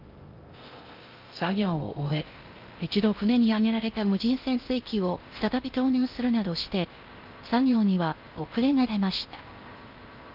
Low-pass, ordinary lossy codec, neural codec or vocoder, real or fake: 5.4 kHz; Opus, 24 kbps; codec, 16 kHz in and 24 kHz out, 0.6 kbps, FocalCodec, streaming, 2048 codes; fake